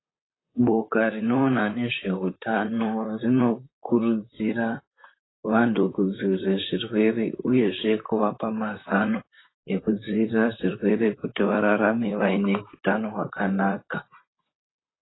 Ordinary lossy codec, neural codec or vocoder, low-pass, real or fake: AAC, 16 kbps; vocoder, 44.1 kHz, 128 mel bands, Pupu-Vocoder; 7.2 kHz; fake